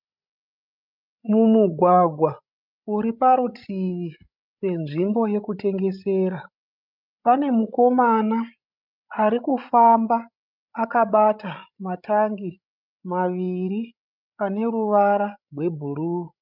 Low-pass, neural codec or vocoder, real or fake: 5.4 kHz; codec, 16 kHz, 16 kbps, FreqCodec, larger model; fake